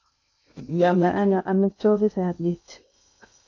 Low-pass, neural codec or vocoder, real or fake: 7.2 kHz; codec, 16 kHz in and 24 kHz out, 0.6 kbps, FocalCodec, streaming, 2048 codes; fake